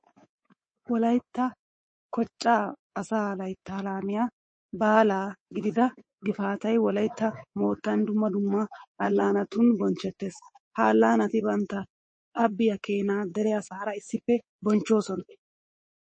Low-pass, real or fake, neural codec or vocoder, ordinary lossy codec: 9.9 kHz; fake; codec, 24 kHz, 3.1 kbps, DualCodec; MP3, 32 kbps